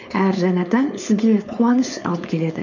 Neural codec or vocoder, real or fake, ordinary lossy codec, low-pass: codec, 16 kHz, 4.8 kbps, FACodec; fake; none; 7.2 kHz